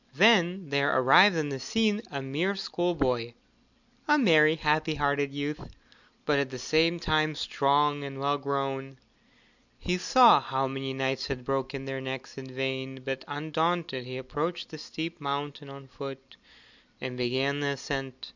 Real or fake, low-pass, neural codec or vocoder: real; 7.2 kHz; none